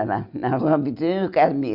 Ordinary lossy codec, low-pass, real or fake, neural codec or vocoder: none; 5.4 kHz; real; none